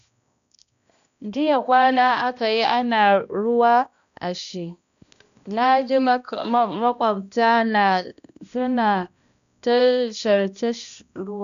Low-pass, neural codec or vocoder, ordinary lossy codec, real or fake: 7.2 kHz; codec, 16 kHz, 1 kbps, X-Codec, HuBERT features, trained on balanced general audio; none; fake